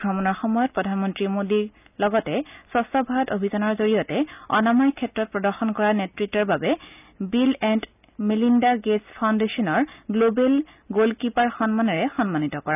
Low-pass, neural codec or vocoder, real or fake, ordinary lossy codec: 3.6 kHz; none; real; none